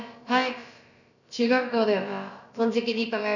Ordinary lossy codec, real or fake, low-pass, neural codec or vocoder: none; fake; 7.2 kHz; codec, 16 kHz, about 1 kbps, DyCAST, with the encoder's durations